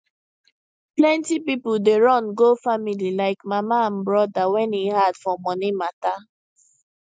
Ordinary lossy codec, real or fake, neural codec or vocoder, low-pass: none; real; none; none